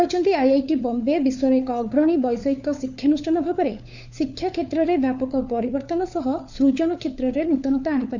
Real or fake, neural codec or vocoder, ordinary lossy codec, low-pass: fake; codec, 16 kHz, 4 kbps, FunCodec, trained on LibriTTS, 50 frames a second; none; 7.2 kHz